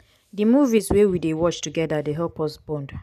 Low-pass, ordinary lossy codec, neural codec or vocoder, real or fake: 14.4 kHz; none; none; real